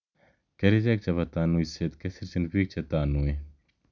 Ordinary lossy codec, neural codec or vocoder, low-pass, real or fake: none; none; 7.2 kHz; real